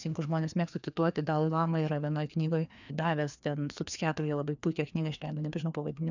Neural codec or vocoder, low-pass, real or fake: codec, 16 kHz, 2 kbps, FreqCodec, larger model; 7.2 kHz; fake